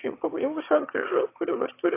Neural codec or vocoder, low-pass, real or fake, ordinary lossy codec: autoencoder, 22.05 kHz, a latent of 192 numbers a frame, VITS, trained on one speaker; 3.6 kHz; fake; AAC, 24 kbps